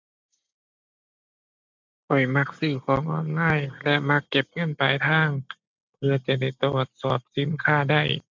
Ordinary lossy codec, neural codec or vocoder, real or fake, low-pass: MP3, 64 kbps; none; real; 7.2 kHz